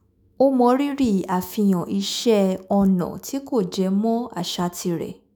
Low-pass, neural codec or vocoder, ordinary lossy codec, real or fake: none; autoencoder, 48 kHz, 128 numbers a frame, DAC-VAE, trained on Japanese speech; none; fake